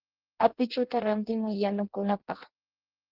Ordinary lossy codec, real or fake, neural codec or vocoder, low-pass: Opus, 16 kbps; fake; codec, 16 kHz in and 24 kHz out, 0.6 kbps, FireRedTTS-2 codec; 5.4 kHz